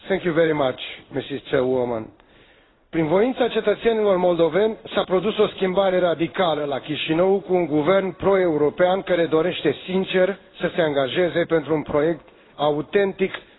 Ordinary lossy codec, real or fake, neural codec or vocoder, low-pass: AAC, 16 kbps; fake; codec, 16 kHz in and 24 kHz out, 1 kbps, XY-Tokenizer; 7.2 kHz